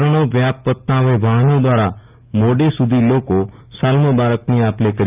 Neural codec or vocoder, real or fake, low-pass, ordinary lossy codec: none; real; 3.6 kHz; Opus, 24 kbps